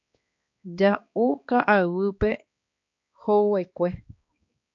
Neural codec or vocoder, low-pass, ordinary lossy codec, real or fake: codec, 16 kHz, 2 kbps, X-Codec, HuBERT features, trained on balanced general audio; 7.2 kHz; AAC, 48 kbps; fake